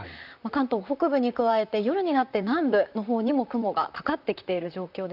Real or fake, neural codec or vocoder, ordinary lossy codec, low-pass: fake; vocoder, 44.1 kHz, 128 mel bands, Pupu-Vocoder; none; 5.4 kHz